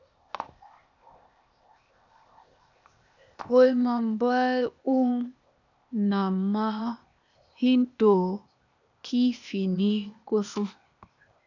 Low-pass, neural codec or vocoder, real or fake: 7.2 kHz; codec, 16 kHz, 0.8 kbps, ZipCodec; fake